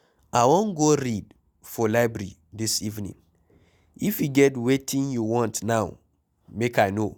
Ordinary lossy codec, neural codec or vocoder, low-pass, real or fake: none; none; none; real